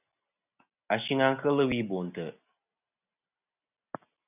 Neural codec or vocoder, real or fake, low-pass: none; real; 3.6 kHz